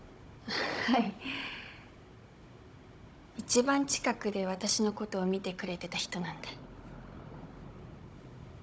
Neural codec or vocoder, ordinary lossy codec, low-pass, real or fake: codec, 16 kHz, 16 kbps, FunCodec, trained on Chinese and English, 50 frames a second; none; none; fake